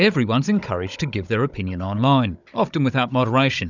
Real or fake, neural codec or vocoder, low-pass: fake; codec, 16 kHz, 16 kbps, FunCodec, trained on Chinese and English, 50 frames a second; 7.2 kHz